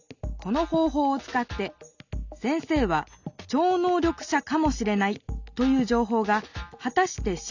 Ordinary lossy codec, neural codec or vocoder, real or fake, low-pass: none; none; real; 7.2 kHz